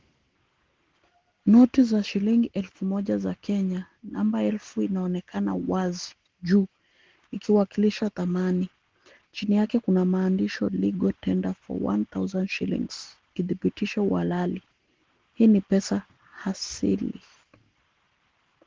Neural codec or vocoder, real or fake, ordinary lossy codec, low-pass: none; real; Opus, 16 kbps; 7.2 kHz